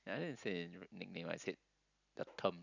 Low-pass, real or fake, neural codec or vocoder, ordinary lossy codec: 7.2 kHz; real; none; none